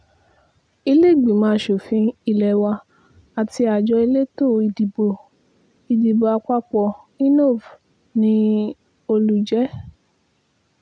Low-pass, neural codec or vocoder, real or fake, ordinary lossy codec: 9.9 kHz; none; real; none